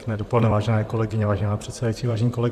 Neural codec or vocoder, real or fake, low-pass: vocoder, 44.1 kHz, 128 mel bands, Pupu-Vocoder; fake; 14.4 kHz